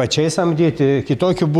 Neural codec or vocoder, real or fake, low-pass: none; real; 19.8 kHz